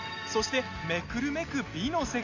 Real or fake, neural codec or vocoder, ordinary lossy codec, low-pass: real; none; none; 7.2 kHz